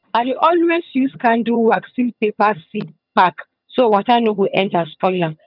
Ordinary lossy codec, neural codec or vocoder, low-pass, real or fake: none; vocoder, 22.05 kHz, 80 mel bands, HiFi-GAN; 5.4 kHz; fake